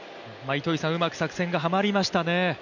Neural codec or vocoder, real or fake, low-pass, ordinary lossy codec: none; real; 7.2 kHz; none